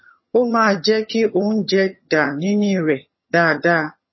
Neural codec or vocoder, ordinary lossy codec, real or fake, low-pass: vocoder, 22.05 kHz, 80 mel bands, HiFi-GAN; MP3, 24 kbps; fake; 7.2 kHz